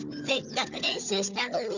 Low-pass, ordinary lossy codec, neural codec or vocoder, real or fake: 7.2 kHz; none; codec, 16 kHz, 4 kbps, FunCodec, trained on Chinese and English, 50 frames a second; fake